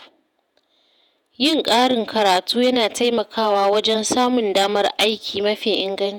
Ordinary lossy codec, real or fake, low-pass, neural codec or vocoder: none; fake; none; vocoder, 48 kHz, 128 mel bands, Vocos